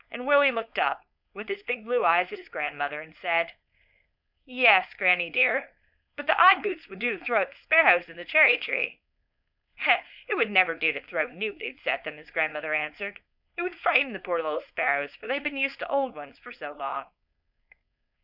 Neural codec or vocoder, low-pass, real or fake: codec, 16 kHz, 4.8 kbps, FACodec; 5.4 kHz; fake